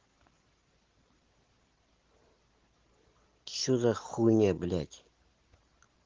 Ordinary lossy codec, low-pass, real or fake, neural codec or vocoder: Opus, 16 kbps; 7.2 kHz; real; none